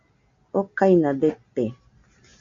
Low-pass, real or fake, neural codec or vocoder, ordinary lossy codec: 7.2 kHz; real; none; AAC, 64 kbps